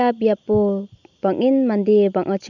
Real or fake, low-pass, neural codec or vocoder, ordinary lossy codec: real; 7.2 kHz; none; none